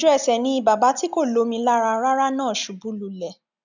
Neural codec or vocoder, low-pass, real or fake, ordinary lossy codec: none; 7.2 kHz; real; none